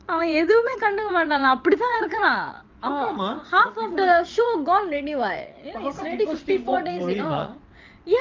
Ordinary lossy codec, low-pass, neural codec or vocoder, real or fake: Opus, 24 kbps; 7.2 kHz; vocoder, 22.05 kHz, 80 mel bands, WaveNeXt; fake